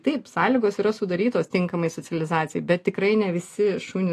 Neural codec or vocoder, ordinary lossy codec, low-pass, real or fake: none; AAC, 48 kbps; 14.4 kHz; real